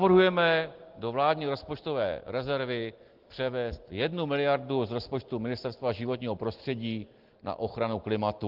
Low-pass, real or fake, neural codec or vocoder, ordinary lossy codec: 5.4 kHz; real; none; Opus, 16 kbps